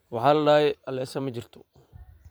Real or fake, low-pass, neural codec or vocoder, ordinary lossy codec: fake; none; vocoder, 44.1 kHz, 128 mel bands every 512 samples, BigVGAN v2; none